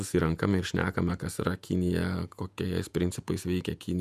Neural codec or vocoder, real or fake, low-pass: vocoder, 48 kHz, 128 mel bands, Vocos; fake; 14.4 kHz